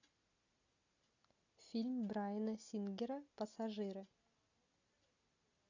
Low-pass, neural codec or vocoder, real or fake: 7.2 kHz; none; real